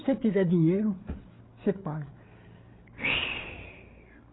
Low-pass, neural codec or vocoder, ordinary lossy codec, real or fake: 7.2 kHz; codec, 16 kHz, 8 kbps, FreqCodec, larger model; AAC, 16 kbps; fake